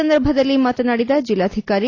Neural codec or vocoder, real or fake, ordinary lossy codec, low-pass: none; real; AAC, 32 kbps; 7.2 kHz